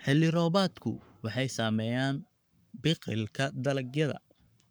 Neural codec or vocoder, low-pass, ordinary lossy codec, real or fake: codec, 44.1 kHz, 7.8 kbps, Pupu-Codec; none; none; fake